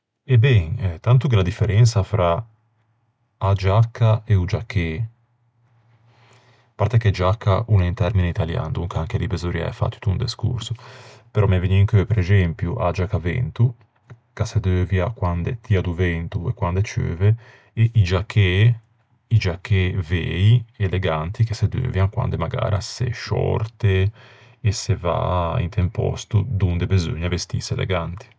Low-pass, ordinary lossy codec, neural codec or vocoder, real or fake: none; none; none; real